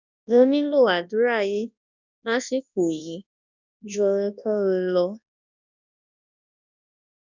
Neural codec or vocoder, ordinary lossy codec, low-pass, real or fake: codec, 24 kHz, 0.9 kbps, WavTokenizer, large speech release; none; 7.2 kHz; fake